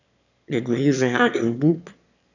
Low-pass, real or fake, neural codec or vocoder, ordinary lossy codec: 7.2 kHz; fake; autoencoder, 22.05 kHz, a latent of 192 numbers a frame, VITS, trained on one speaker; none